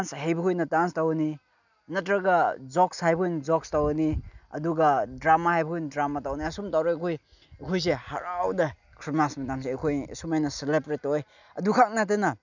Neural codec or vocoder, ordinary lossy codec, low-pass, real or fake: none; none; 7.2 kHz; real